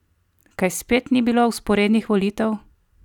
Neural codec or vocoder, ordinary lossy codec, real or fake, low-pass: none; none; real; 19.8 kHz